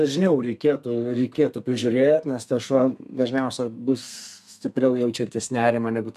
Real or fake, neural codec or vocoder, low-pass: fake; codec, 32 kHz, 1.9 kbps, SNAC; 14.4 kHz